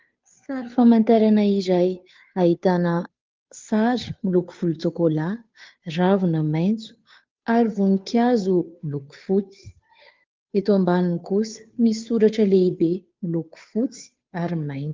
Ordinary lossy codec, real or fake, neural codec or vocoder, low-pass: Opus, 24 kbps; fake; codec, 16 kHz, 2 kbps, FunCodec, trained on Chinese and English, 25 frames a second; 7.2 kHz